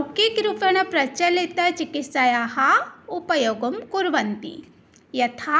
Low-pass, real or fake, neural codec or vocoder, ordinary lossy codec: none; real; none; none